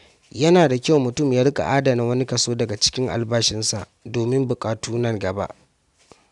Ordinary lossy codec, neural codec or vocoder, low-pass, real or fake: none; none; 10.8 kHz; real